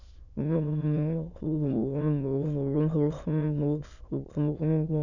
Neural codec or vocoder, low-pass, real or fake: autoencoder, 22.05 kHz, a latent of 192 numbers a frame, VITS, trained on many speakers; 7.2 kHz; fake